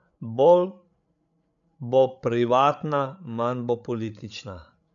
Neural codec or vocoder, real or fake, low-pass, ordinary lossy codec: codec, 16 kHz, 8 kbps, FreqCodec, larger model; fake; 7.2 kHz; none